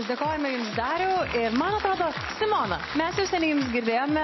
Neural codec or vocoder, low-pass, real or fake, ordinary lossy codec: none; 7.2 kHz; real; MP3, 24 kbps